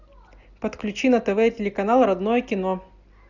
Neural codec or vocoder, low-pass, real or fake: none; 7.2 kHz; real